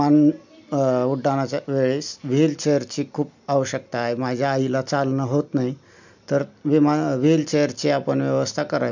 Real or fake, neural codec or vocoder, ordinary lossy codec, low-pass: real; none; none; 7.2 kHz